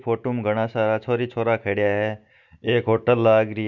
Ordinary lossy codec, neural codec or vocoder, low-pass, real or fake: none; none; 7.2 kHz; real